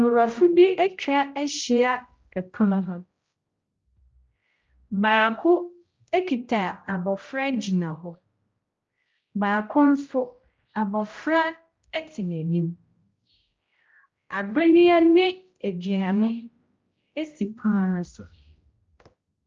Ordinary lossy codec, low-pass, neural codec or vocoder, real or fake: Opus, 24 kbps; 7.2 kHz; codec, 16 kHz, 0.5 kbps, X-Codec, HuBERT features, trained on general audio; fake